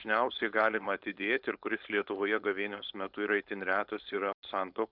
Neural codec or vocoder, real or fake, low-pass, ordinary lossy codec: codec, 16 kHz, 4.8 kbps, FACodec; fake; 5.4 kHz; Opus, 64 kbps